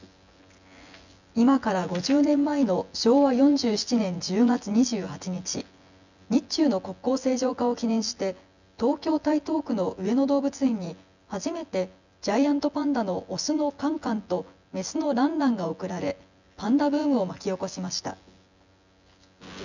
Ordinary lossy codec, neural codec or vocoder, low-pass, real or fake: none; vocoder, 24 kHz, 100 mel bands, Vocos; 7.2 kHz; fake